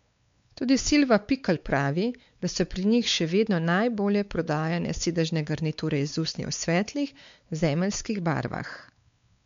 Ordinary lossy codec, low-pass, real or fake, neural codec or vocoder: MP3, 64 kbps; 7.2 kHz; fake; codec, 16 kHz, 4 kbps, X-Codec, WavLM features, trained on Multilingual LibriSpeech